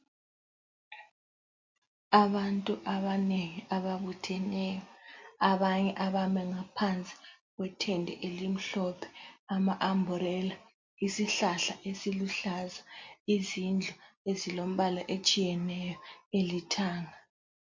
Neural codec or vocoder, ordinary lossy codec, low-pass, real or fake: none; MP3, 48 kbps; 7.2 kHz; real